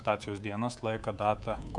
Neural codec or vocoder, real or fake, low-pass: codec, 24 kHz, 3.1 kbps, DualCodec; fake; 10.8 kHz